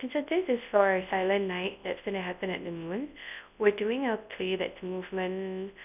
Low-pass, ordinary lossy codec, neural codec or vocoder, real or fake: 3.6 kHz; none; codec, 24 kHz, 0.9 kbps, WavTokenizer, large speech release; fake